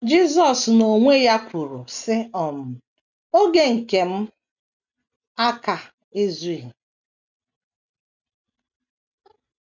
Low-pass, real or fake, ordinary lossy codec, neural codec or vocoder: 7.2 kHz; real; none; none